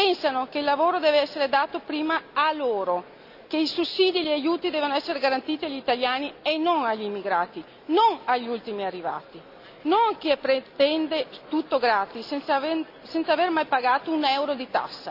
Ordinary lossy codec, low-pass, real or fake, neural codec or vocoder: none; 5.4 kHz; real; none